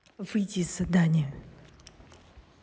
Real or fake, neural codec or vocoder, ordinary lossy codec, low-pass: real; none; none; none